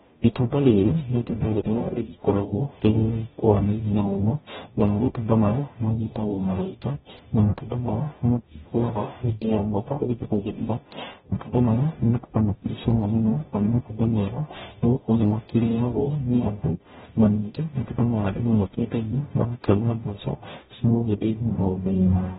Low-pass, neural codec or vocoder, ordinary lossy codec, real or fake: 19.8 kHz; codec, 44.1 kHz, 0.9 kbps, DAC; AAC, 16 kbps; fake